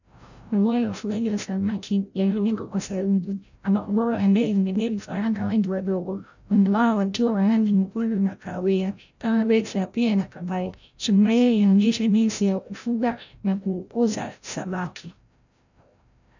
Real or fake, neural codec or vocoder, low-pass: fake; codec, 16 kHz, 0.5 kbps, FreqCodec, larger model; 7.2 kHz